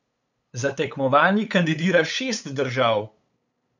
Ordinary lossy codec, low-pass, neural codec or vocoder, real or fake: none; 7.2 kHz; codec, 16 kHz, 8 kbps, FunCodec, trained on LibriTTS, 25 frames a second; fake